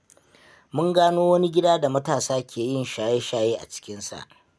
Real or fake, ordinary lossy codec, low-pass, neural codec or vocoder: real; none; none; none